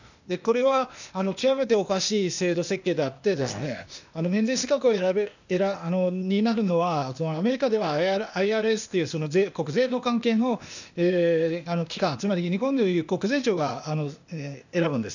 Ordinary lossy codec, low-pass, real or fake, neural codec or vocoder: none; 7.2 kHz; fake; codec, 16 kHz, 0.8 kbps, ZipCodec